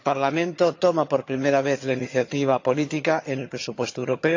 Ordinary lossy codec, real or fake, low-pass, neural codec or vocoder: AAC, 48 kbps; fake; 7.2 kHz; vocoder, 22.05 kHz, 80 mel bands, HiFi-GAN